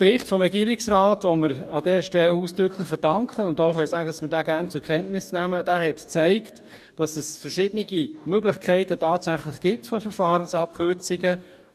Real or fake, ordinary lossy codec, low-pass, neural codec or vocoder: fake; none; 14.4 kHz; codec, 44.1 kHz, 2.6 kbps, DAC